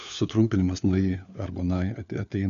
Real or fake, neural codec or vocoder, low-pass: fake; codec, 16 kHz, 4 kbps, FunCodec, trained on LibriTTS, 50 frames a second; 7.2 kHz